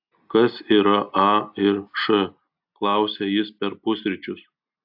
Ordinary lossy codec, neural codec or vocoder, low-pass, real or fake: AAC, 48 kbps; none; 5.4 kHz; real